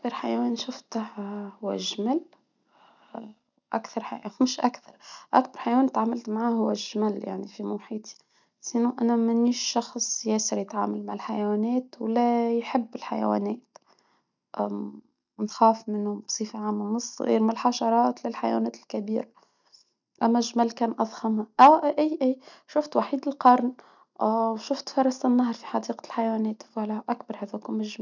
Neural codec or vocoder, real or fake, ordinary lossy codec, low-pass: none; real; none; 7.2 kHz